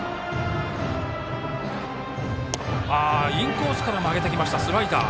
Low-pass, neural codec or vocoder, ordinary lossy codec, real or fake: none; none; none; real